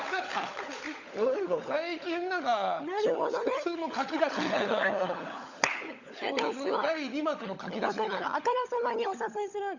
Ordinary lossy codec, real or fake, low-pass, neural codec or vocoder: Opus, 64 kbps; fake; 7.2 kHz; codec, 16 kHz, 16 kbps, FunCodec, trained on LibriTTS, 50 frames a second